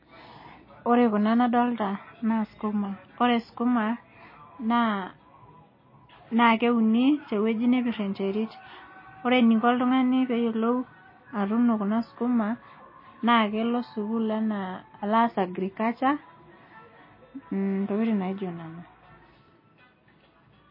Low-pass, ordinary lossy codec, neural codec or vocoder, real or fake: 5.4 kHz; MP3, 24 kbps; none; real